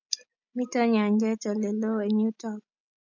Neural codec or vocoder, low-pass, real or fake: none; 7.2 kHz; real